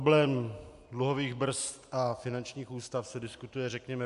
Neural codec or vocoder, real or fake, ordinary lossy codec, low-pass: none; real; AAC, 96 kbps; 10.8 kHz